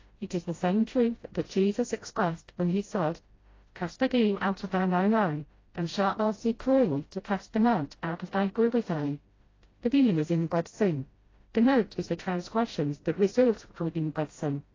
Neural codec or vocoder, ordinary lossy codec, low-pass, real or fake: codec, 16 kHz, 0.5 kbps, FreqCodec, smaller model; AAC, 32 kbps; 7.2 kHz; fake